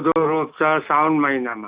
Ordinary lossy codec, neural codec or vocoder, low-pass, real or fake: Opus, 64 kbps; none; 3.6 kHz; real